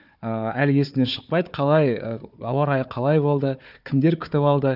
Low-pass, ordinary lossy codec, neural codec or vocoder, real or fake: 5.4 kHz; none; codec, 16 kHz, 4 kbps, FunCodec, trained on Chinese and English, 50 frames a second; fake